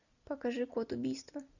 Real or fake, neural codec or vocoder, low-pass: real; none; 7.2 kHz